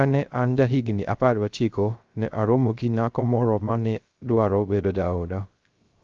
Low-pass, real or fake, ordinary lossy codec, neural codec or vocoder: 7.2 kHz; fake; Opus, 32 kbps; codec, 16 kHz, 0.3 kbps, FocalCodec